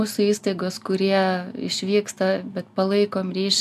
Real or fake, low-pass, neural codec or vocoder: real; 14.4 kHz; none